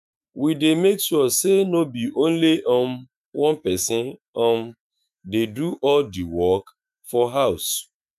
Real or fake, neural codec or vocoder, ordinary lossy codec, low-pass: fake; autoencoder, 48 kHz, 128 numbers a frame, DAC-VAE, trained on Japanese speech; none; 14.4 kHz